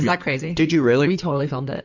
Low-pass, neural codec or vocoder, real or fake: 7.2 kHz; codec, 16 kHz in and 24 kHz out, 2.2 kbps, FireRedTTS-2 codec; fake